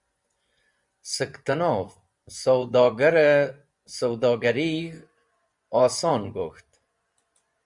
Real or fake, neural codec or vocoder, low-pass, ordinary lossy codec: fake; vocoder, 24 kHz, 100 mel bands, Vocos; 10.8 kHz; Opus, 64 kbps